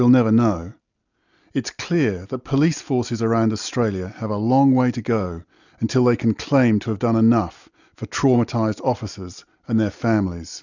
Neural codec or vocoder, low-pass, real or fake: none; 7.2 kHz; real